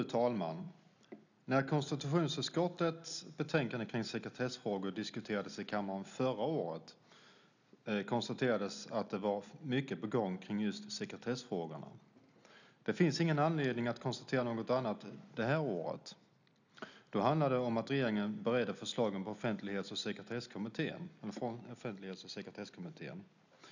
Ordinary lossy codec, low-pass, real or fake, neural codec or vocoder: none; 7.2 kHz; real; none